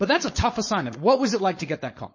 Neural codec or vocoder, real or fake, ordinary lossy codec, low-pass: codec, 16 kHz, 4.8 kbps, FACodec; fake; MP3, 32 kbps; 7.2 kHz